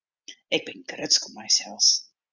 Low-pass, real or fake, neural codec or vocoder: 7.2 kHz; real; none